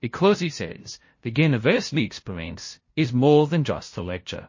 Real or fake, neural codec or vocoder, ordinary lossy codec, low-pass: fake; codec, 16 kHz, 0.5 kbps, FunCodec, trained on LibriTTS, 25 frames a second; MP3, 32 kbps; 7.2 kHz